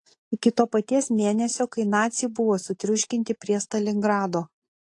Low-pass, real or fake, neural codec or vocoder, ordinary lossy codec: 10.8 kHz; real; none; AAC, 48 kbps